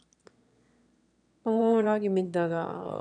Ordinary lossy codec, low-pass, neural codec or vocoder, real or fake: none; 9.9 kHz; autoencoder, 22.05 kHz, a latent of 192 numbers a frame, VITS, trained on one speaker; fake